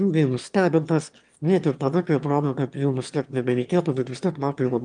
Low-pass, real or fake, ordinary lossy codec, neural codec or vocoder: 9.9 kHz; fake; Opus, 32 kbps; autoencoder, 22.05 kHz, a latent of 192 numbers a frame, VITS, trained on one speaker